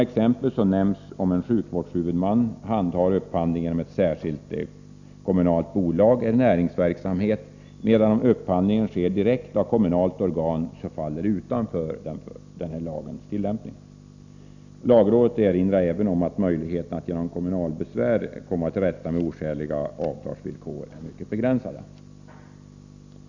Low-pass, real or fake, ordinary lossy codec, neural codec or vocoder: 7.2 kHz; real; none; none